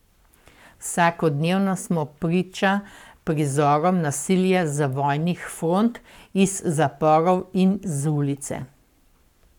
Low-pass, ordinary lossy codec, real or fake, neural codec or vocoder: 19.8 kHz; none; fake; codec, 44.1 kHz, 7.8 kbps, Pupu-Codec